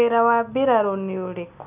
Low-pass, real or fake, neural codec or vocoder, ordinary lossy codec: 3.6 kHz; real; none; none